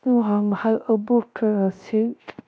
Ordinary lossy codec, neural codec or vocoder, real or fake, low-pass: none; codec, 16 kHz, 0.3 kbps, FocalCodec; fake; none